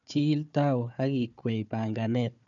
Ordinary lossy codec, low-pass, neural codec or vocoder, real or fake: none; 7.2 kHz; codec, 16 kHz, 4 kbps, FunCodec, trained on Chinese and English, 50 frames a second; fake